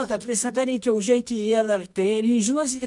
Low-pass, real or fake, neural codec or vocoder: 10.8 kHz; fake; codec, 24 kHz, 0.9 kbps, WavTokenizer, medium music audio release